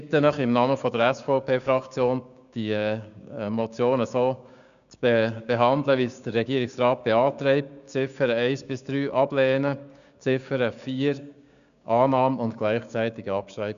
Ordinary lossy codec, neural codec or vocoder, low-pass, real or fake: none; codec, 16 kHz, 6 kbps, DAC; 7.2 kHz; fake